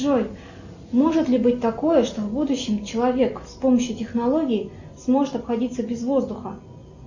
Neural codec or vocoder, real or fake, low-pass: none; real; 7.2 kHz